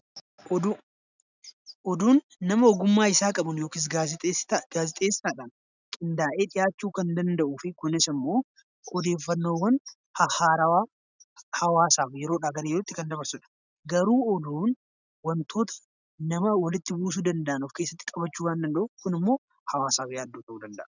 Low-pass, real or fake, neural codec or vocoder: 7.2 kHz; real; none